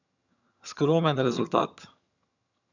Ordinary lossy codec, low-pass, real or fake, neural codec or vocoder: none; 7.2 kHz; fake; vocoder, 22.05 kHz, 80 mel bands, HiFi-GAN